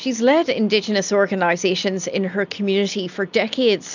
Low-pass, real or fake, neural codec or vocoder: 7.2 kHz; real; none